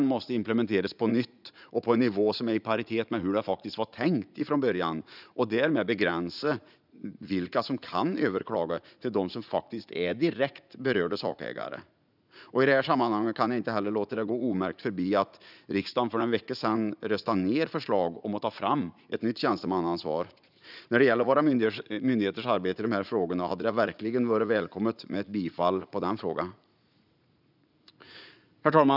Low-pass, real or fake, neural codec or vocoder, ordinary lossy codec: 5.4 kHz; fake; vocoder, 44.1 kHz, 80 mel bands, Vocos; none